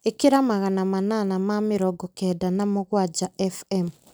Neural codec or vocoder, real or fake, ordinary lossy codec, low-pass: none; real; none; none